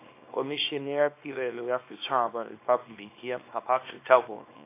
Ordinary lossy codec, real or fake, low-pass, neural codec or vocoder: AAC, 24 kbps; fake; 3.6 kHz; codec, 24 kHz, 0.9 kbps, WavTokenizer, small release